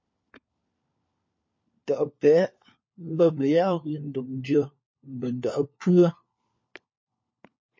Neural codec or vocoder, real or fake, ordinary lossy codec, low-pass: codec, 16 kHz, 4 kbps, FunCodec, trained on LibriTTS, 50 frames a second; fake; MP3, 32 kbps; 7.2 kHz